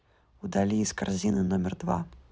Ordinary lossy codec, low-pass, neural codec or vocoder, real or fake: none; none; none; real